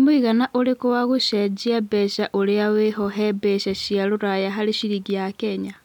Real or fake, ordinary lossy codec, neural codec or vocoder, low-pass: real; none; none; 19.8 kHz